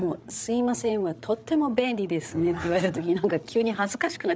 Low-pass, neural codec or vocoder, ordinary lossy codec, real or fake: none; codec, 16 kHz, 8 kbps, FreqCodec, larger model; none; fake